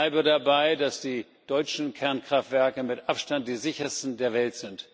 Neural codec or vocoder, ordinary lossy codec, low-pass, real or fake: none; none; none; real